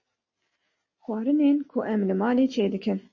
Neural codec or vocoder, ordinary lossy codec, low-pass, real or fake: none; MP3, 32 kbps; 7.2 kHz; real